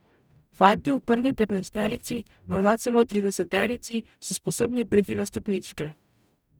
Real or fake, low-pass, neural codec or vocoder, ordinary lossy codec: fake; none; codec, 44.1 kHz, 0.9 kbps, DAC; none